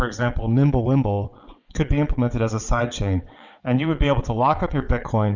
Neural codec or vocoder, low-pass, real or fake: vocoder, 22.05 kHz, 80 mel bands, WaveNeXt; 7.2 kHz; fake